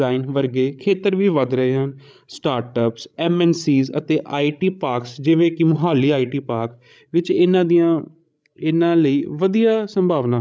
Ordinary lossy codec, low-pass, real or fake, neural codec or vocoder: none; none; fake; codec, 16 kHz, 16 kbps, FreqCodec, larger model